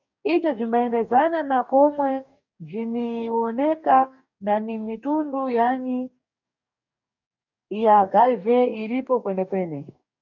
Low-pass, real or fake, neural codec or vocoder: 7.2 kHz; fake; codec, 44.1 kHz, 2.6 kbps, DAC